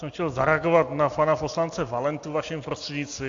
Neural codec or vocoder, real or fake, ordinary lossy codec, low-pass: none; real; AAC, 48 kbps; 7.2 kHz